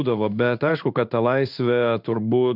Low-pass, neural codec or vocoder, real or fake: 5.4 kHz; codec, 16 kHz in and 24 kHz out, 1 kbps, XY-Tokenizer; fake